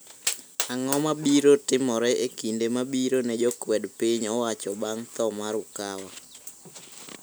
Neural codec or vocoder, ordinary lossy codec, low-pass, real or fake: none; none; none; real